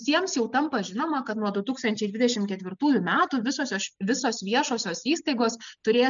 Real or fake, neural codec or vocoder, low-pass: real; none; 7.2 kHz